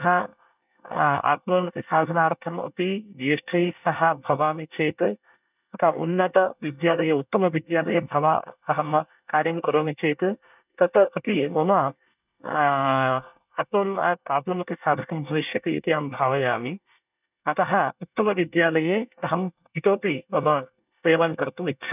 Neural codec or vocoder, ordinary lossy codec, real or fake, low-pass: codec, 24 kHz, 1 kbps, SNAC; none; fake; 3.6 kHz